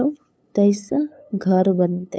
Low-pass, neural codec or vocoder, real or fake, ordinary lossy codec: none; codec, 16 kHz, 8 kbps, FunCodec, trained on LibriTTS, 25 frames a second; fake; none